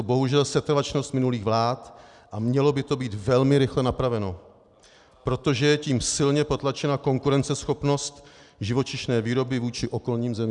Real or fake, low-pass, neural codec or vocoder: real; 10.8 kHz; none